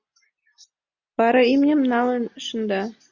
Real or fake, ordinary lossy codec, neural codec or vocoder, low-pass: real; Opus, 64 kbps; none; 7.2 kHz